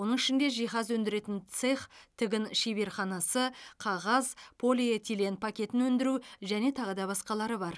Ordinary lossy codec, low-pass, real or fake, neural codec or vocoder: none; none; real; none